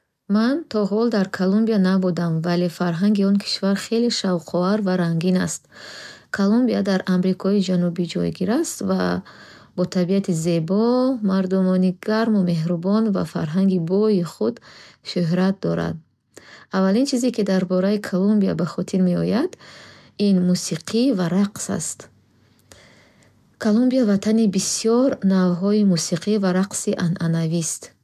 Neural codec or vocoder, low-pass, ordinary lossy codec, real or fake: none; 14.4 kHz; MP3, 96 kbps; real